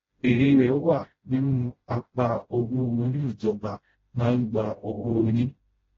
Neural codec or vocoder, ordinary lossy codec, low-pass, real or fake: codec, 16 kHz, 0.5 kbps, FreqCodec, smaller model; AAC, 24 kbps; 7.2 kHz; fake